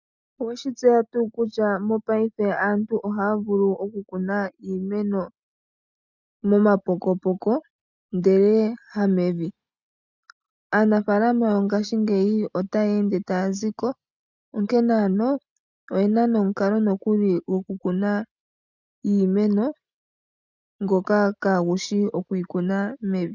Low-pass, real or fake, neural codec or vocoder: 7.2 kHz; real; none